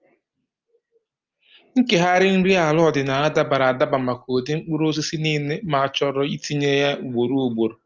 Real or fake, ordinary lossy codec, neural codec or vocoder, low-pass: real; Opus, 24 kbps; none; 7.2 kHz